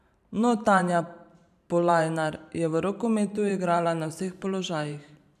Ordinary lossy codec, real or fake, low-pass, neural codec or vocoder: none; fake; 14.4 kHz; vocoder, 44.1 kHz, 128 mel bands every 512 samples, BigVGAN v2